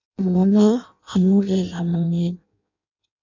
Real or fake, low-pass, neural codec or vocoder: fake; 7.2 kHz; codec, 16 kHz in and 24 kHz out, 0.6 kbps, FireRedTTS-2 codec